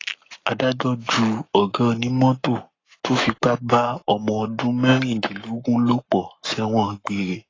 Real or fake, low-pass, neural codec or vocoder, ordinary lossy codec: fake; 7.2 kHz; codec, 44.1 kHz, 7.8 kbps, Pupu-Codec; AAC, 32 kbps